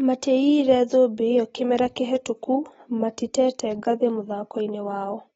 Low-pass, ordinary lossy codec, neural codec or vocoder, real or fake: 10.8 kHz; AAC, 24 kbps; none; real